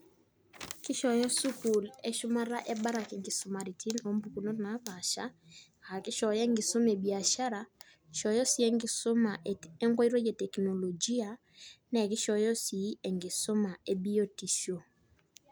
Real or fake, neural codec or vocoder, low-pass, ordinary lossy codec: real; none; none; none